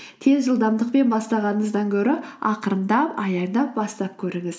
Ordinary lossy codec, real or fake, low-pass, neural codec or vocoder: none; real; none; none